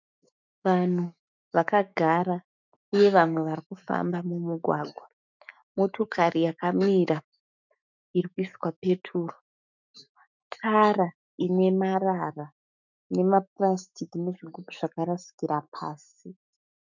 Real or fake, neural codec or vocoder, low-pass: fake; autoencoder, 48 kHz, 128 numbers a frame, DAC-VAE, trained on Japanese speech; 7.2 kHz